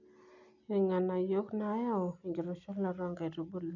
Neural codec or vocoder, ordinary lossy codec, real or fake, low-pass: none; none; real; 7.2 kHz